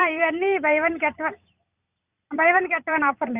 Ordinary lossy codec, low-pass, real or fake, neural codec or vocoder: Opus, 64 kbps; 3.6 kHz; fake; vocoder, 44.1 kHz, 128 mel bands every 256 samples, BigVGAN v2